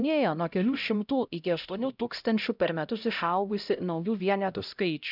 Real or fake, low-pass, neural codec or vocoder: fake; 5.4 kHz; codec, 16 kHz, 0.5 kbps, X-Codec, HuBERT features, trained on LibriSpeech